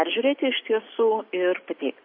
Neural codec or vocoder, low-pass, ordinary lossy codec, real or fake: none; 5.4 kHz; MP3, 32 kbps; real